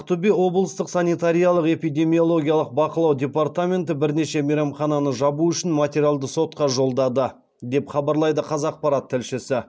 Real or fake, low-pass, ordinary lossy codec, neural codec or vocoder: real; none; none; none